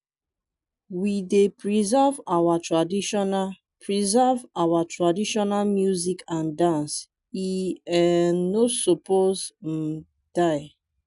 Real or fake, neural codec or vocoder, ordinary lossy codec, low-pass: real; none; none; 10.8 kHz